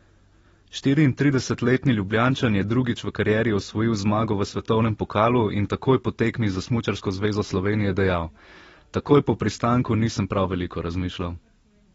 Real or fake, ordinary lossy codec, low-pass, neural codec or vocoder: real; AAC, 24 kbps; 19.8 kHz; none